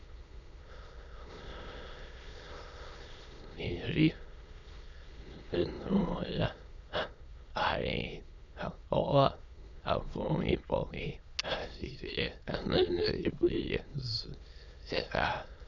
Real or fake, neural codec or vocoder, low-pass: fake; autoencoder, 22.05 kHz, a latent of 192 numbers a frame, VITS, trained on many speakers; 7.2 kHz